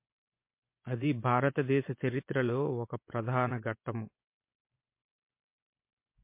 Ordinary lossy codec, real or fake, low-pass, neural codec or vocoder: MP3, 24 kbps; fake; 3.6 kHz; vocoder, 24 kHz, 100 mel bands, Vocos